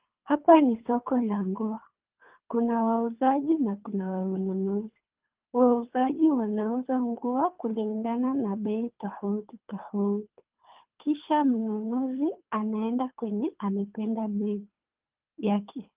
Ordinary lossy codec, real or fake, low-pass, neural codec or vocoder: Opus, 32 kbps; fake; 3.6 kHz; codec, 24 kHz, 3 kbps, HILCodec